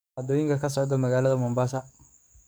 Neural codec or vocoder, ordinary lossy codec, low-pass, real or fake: codec, 44.1 kHz, 7.8 kbps, DAC; none; none; fake